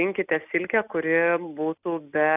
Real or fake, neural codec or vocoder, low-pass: real; none; 3.6 kHz